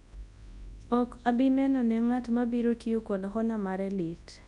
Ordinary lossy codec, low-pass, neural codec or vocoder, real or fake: MP3, 96 kbps; 10.8 kHz; codec, 24 kHz, 0.9 kbps, WavTokenizer, large speech release; fake